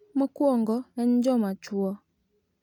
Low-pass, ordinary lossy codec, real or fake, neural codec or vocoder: 19.8 kHz; none; real; none